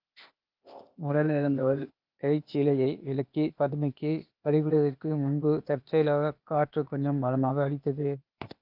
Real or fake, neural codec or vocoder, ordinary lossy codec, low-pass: fake; codec, 16 kHz, 0.8 kbps, ZipCodec; Opus, 24 kbps; 5.4 kHz